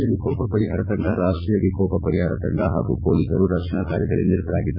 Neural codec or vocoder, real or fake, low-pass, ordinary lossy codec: vocoder, 44.1 kHz, 80 mel bands, Vocos; fake; 5.4 kHz; MP3, 24 kbps